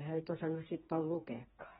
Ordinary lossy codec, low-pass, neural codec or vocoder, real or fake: AAC, 16 kbps; 7.2 kHz; codec, 16 kHz, 4 kbps, FreqCodec, smaller model; fake